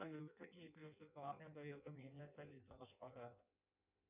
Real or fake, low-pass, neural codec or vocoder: fake; 3.6 kHz; codec, 16 kHz in and 24 kHz out, 0.6 kbps, FireRedTTS-2 codec